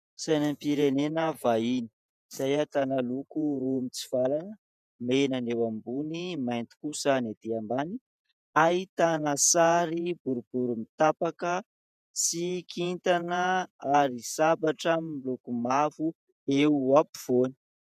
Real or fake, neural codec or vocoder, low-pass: fake; vocoder, 48 kHz, 128 mel bands, Vocos; 14.4 kHz